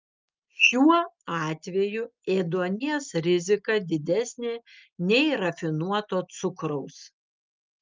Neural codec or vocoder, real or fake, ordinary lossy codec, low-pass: none; real; Opus, 24 kbps; 7.2 kHz